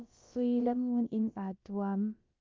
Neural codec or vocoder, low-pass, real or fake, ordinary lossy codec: codec, 16 kHz, about 1 kbps, DyCAST, with the encoder's durations; 7.2 kHz; fake; Opus, 24 kbps